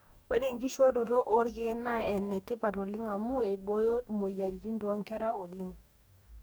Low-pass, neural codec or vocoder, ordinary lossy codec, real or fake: none; codec, 44.1 kHz, 2.6 kbps, DAC; none; fake